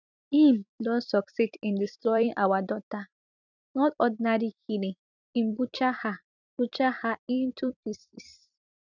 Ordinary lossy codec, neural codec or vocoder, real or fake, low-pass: none; vocoder, 44.1 kHz, 128 mel bands every 256 samples, BigVGAN v2; fake; 7.2 kHz